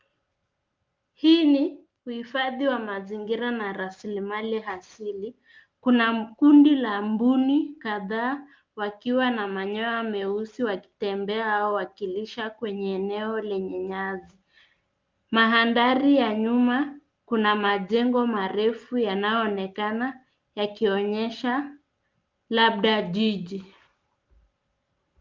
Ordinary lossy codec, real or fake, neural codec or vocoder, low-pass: Opus, 24 kbps; real; none; 7.2 kHz